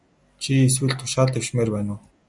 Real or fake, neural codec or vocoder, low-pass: real; none; 10.8 kHz